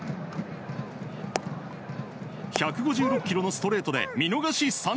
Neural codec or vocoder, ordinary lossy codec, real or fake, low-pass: none; none; real; none